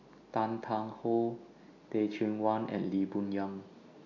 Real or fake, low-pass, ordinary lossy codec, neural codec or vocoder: real; 7.2 kHz; none; none